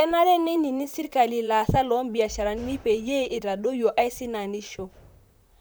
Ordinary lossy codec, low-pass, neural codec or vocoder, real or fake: none; none; vocoder, 44.1 kHz, 128 mel bands, Pupu-Vocoder; fake